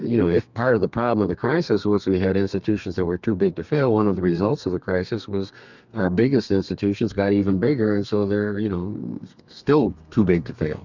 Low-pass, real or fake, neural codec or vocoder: 7.2 kHz; fake; codec, 32 kHz, 1.9 kbps, SNAC